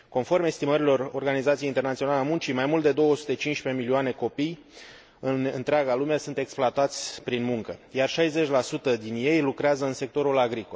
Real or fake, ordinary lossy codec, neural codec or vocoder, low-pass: real; none; none; none